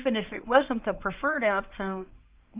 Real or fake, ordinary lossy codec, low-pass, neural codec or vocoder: fake; Opus, 32 kbps; 3.6 kHz; codec, 24 kHz, 0.9 kbps, WavTokenizer, medium speech release version 1